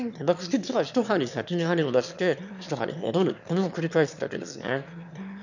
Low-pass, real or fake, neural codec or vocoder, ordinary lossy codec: 7.2 kHz; fake; autoencoder, 22.05 kHz, a latent of 192 numbers a frame, VITS, trained on one speaker; none